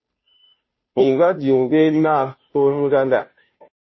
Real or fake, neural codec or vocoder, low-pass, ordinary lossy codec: fake; codec, 16 kHz, 0.5 kbps, FunCodec, trained on Chinese and English, 25 frames a second; 7.2 kHz; MP3, 24 kbps